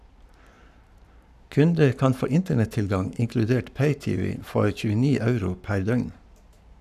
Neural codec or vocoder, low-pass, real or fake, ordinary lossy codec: codec, 44.1 kHz, 7.8 kbps, Pupu-Codec; 14.4 kHz; fake; none